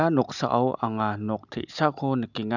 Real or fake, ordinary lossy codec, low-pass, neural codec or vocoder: real; none; 7.2 kHz; none